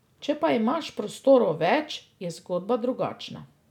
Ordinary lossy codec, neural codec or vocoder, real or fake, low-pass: none; none; real; 19.8 kHz